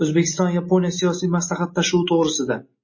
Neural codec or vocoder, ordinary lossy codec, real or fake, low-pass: none; MP3, 32 kbps; real; 7.2 kHz